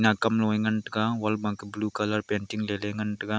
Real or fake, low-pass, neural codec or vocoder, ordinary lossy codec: real; none; none; none